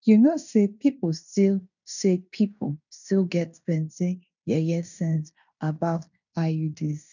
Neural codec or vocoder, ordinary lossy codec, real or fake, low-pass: codec, 16 kHz in and 24 kHz out, 0.9 kbps, LongCat-Audio-Codec, fine tuned four codebook decoder; none; fake; 7.2 kHz